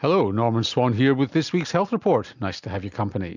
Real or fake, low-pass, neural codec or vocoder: real; 7.2 kHz; none